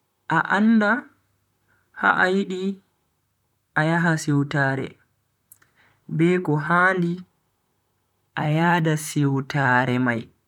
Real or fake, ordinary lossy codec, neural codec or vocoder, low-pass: fake; none; vocoder, 44.1 kHz, 128 mel bands, Pupu-Vocoder; 19.8 kHz